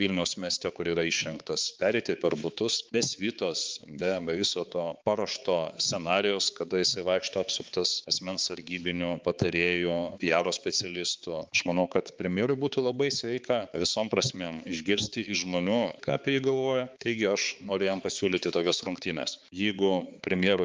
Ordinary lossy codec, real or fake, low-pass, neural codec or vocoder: Opus, 24 kbps; fake; 7.2 kHz; codec, 16 kHz, 4 kbps, X-Codec, HuBERT features, trained on balanced general audio